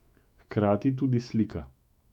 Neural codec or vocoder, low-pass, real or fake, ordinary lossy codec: autoencoder, 48 kHz, 128 numbers a frame, DAC-VAE, trained on Japanese speech; 19.8 kHz; fake; none